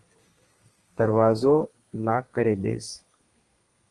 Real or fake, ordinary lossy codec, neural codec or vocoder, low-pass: fake; Opus, 24 kbps; codec, 32 kHz, 1.9 kbps, SNAC; 10.8 kHz